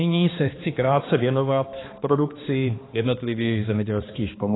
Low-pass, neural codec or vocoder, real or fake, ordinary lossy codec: 7.2 kHz; codec, 16 kHz, 2 kbps, X-Codec, HuBERT features, trained on balanced general audio; fake; AAC, 16 kbps